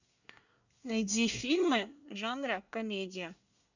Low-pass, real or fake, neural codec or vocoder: 7.2 kHz; fake; codec, 24 kHz, 1 kbps, SNAC